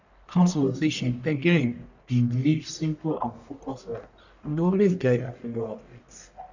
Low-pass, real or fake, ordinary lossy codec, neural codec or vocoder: 7.2 kHz; fake; none; codec, 44.1 kHz, 1.7 kbps, Pupu-Codec